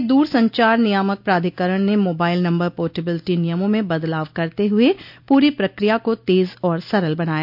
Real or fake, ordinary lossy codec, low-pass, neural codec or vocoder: real; none; 5.4 kHz; none